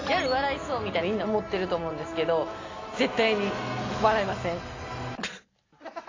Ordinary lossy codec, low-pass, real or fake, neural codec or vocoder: AAC, 32 kbps; 7.2 kHz; real; none